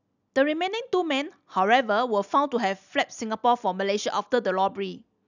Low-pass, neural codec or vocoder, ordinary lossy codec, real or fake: 7.2 kHz; none; none; real